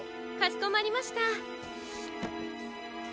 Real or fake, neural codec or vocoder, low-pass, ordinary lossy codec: real; none; none; none